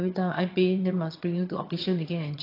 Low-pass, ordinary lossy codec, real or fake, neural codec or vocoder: 5.4 kHz; AAC, 32 kbps; fake; vocoder, 22.05 kHz, 80 mel bands, HiFi-GAN